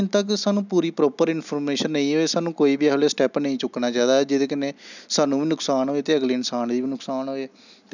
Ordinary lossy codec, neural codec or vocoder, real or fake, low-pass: none; none; real; 7.2 kHz